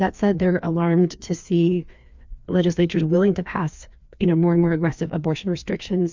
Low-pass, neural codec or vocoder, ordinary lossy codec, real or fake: 7.2 kHz; codec, 16 kHz, 2 kbps, FreqCodec, larger model; MP3, 64 kbps; fake